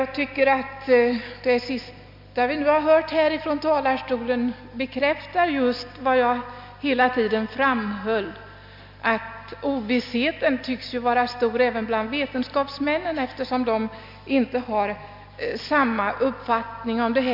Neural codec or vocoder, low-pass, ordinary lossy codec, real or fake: none; 5.4 kHz; MP3, 48 kbps; real